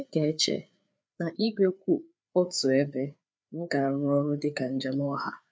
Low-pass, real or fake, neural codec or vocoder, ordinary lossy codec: none; fake; codec, 16 kHz, 4 kbps, FreqCodec, larger model; none